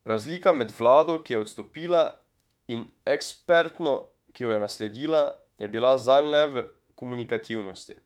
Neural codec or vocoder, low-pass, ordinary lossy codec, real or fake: autoencoder, 48 kHz, 32 numbers a frame, DAC-VAE, trained on Japanese speech; 19.8 kHz; MP3, 96 kbps; fake